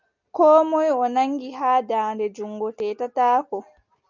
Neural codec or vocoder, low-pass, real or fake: none; 7.2 kHz; real